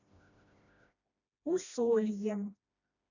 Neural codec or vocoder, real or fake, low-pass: codec, 16 kHz, 1 kbps, FreqCodec, smaller model; fake; 7.2 kHz